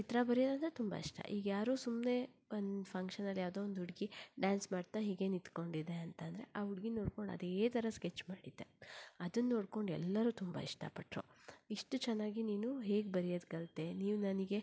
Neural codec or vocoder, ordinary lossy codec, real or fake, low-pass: none; none; real; none